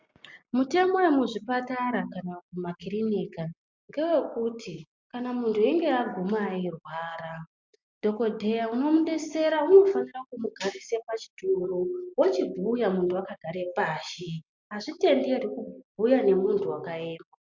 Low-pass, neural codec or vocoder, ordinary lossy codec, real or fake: 7.2 kHz; none; MP3, 64 kbps; real